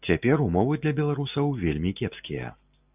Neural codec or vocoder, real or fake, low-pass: none; real; 3.6 kHz